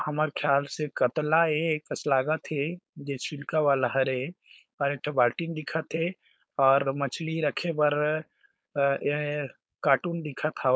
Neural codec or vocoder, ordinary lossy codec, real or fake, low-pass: codec, 16 kHz, 4.8 kbps, FACodec; none; fake; none